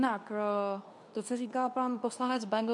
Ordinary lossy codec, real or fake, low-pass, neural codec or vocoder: MP3, 64 kbps; fake; 10.8 kHz; codec, 24 kHz, 0.9 kbps, WavTokenizer, medium speech release version 2